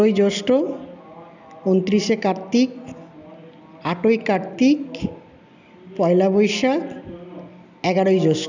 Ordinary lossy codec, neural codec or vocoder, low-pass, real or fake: none; none; 7.2 kHz; real